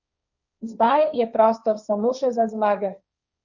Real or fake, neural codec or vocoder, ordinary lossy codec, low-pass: fake; codec, 16 kHz, 1.1 kbps, Voila-Tokenizer; none; 7.2 kHz